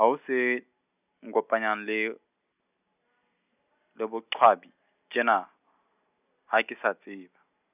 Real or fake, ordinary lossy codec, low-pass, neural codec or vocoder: real; none; 3.6 kHz; none